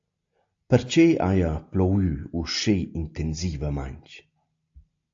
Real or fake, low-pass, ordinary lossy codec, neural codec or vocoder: real; 7.2 kHz; AAC, 48 kbps; none